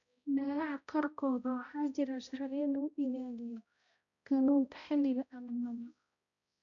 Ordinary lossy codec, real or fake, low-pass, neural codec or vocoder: none; fake; 7.2 kHz; codec, 16 kHz, 0.5 kbps, X-Codec, HuBERT features, trained on balanced general audio